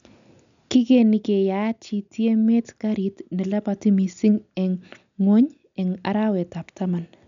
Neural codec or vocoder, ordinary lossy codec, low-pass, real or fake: none; none; 7.2 kHz; real